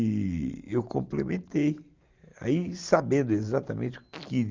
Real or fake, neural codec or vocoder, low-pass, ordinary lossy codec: real; none; 7.2 kHz; Opus, 32 kbps